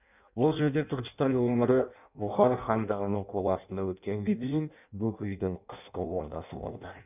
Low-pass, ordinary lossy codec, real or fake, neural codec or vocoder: 3.6 kHz; none; fake; codec, 16 kHz in and 24 kHz out, 0.6 kbps, FireRedTTS-2 codec